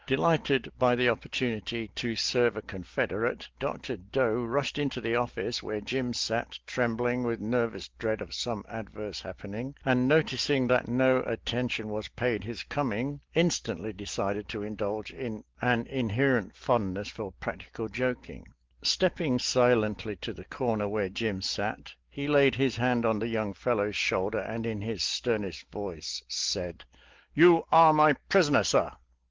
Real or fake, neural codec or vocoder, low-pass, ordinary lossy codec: real; none; 7.2 kHz; Opus, 16 kbps